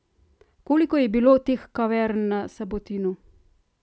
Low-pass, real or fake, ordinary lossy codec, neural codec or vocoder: none; real; none; none